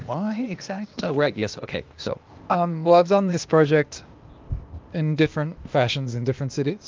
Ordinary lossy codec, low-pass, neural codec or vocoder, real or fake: Opus, 32 kbps; 7.2 kHz; codec, 16 kHz, 0.8 kbps, ZipCodec; fake